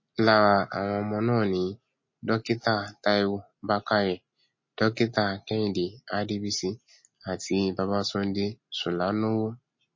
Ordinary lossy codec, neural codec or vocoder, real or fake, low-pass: MP3, 32 kbps; none; real; 7.2 kHz